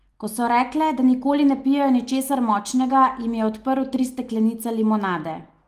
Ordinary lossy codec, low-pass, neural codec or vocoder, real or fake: Opus, 24 kbps; 14.4 kHz; none; real